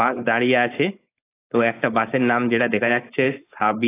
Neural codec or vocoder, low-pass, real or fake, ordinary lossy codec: codec, 16 kHz, 4.8 kbps, FACodec; 3.6 kHz; fake; AAC, 24 kbps